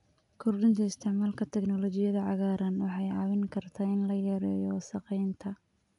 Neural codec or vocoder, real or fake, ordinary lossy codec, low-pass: none; real; none; 10.8 kHz